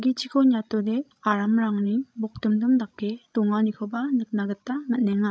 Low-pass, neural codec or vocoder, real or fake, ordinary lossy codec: none; codec, 16 kHz, 16 kbps, FreqCodec, larger model; fake; none